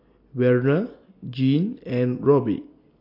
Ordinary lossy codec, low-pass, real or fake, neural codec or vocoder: MP3, 32 kbps; 5.4 kHz; real; none